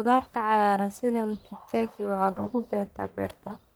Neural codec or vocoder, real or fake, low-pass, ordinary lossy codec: codec, 44.1 kHz, 1.7 kbps, Pupu-Codec; fake; none; none